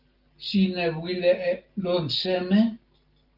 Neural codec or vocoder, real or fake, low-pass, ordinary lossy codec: none; real; 5.4 kHz; Opus, 32 kbps